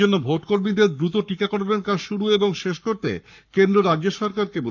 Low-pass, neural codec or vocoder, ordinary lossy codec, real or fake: 7.2 kHz; codec, 44.1 kHz, 7.8 kbps, Pupu-Codec; none; fake